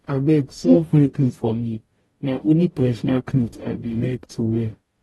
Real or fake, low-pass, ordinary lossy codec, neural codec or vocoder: fake; 19.8 kHz; AAC, 32 kbps; codec, 44.1 kHz, 0.9 kbps, DAC